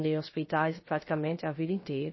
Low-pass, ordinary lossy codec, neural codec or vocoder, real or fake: 7.2 kHz; MP3, 24 kbps; codec, 16 kHz, 0.3 kbps, FocalCodec; fake